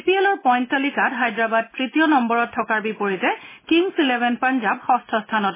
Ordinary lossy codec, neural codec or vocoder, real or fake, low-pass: MP3, 16 kbps; none; real; 3.6 kHz